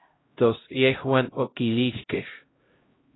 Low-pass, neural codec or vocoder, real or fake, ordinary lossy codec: 7.2 kHz; codec, 16 kHz, 0.5 kbps, X-Codec, HuBERT features, trained on LibriSpeech; fake; AAC, 16 kbps